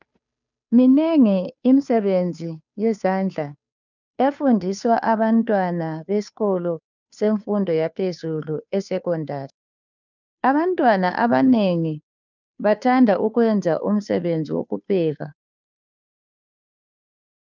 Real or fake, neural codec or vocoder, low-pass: fake; codec, 16 kHz, 2 kbps, FunCodec, trained on Chinese and English, 25 frames a second; 7.2 kHz